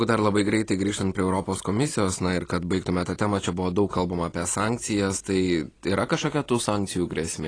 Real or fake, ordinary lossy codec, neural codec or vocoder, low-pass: real; AAC, 32 kbps; none; 9.9 kHz